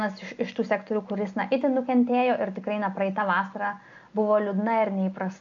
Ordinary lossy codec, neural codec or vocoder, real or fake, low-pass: AAC, 64 kbps; none; real; 7.2 kHz